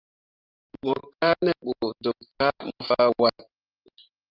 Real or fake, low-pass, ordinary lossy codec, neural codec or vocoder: fake; 5.4 kHz; Opus, 24 kbps; vocoder, 44.1 kHz, 128 mel bands every 512 samples, BigVGAN v2